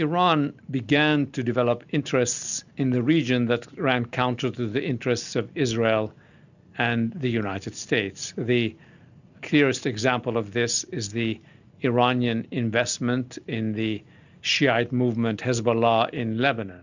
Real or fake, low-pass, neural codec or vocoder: real; 7.2 kHz; none